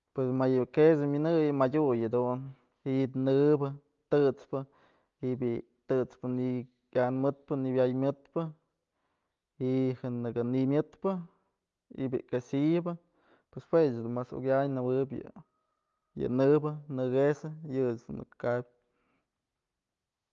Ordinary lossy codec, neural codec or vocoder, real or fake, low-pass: Opus, 32 kbps; none; real; 7.2 kHz